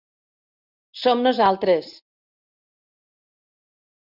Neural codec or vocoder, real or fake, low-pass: none; real; 5.4 kHz